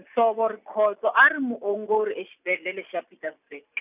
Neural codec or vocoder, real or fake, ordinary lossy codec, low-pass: none; real; none; 3.6 kHz